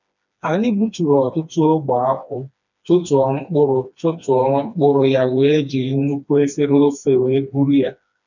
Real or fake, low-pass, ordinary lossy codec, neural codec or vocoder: fake; 7.2 kHz; none; codec, 16 kHz, 2 kbps, FreqCodec, smaller model